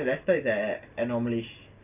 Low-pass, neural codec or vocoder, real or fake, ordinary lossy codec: 3.6 kHz; none; real; none